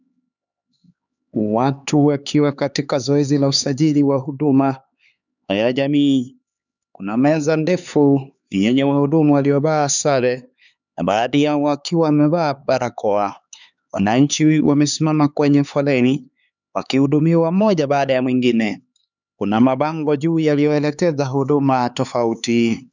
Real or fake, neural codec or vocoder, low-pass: fake; codec, 16 kHz, 4 kbps, X-Codec, HuBERT features, trained on LibriSpeech; 7.2 kHz